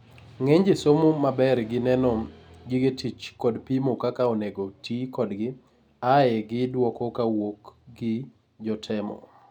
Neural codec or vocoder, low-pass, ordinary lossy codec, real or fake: none; 19.8 kHz; none; real